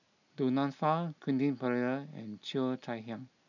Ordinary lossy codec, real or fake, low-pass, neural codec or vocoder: none; real; 7.2 kHz; none